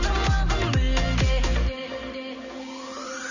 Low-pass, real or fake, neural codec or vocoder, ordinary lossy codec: 7.2 kHz; real; none; none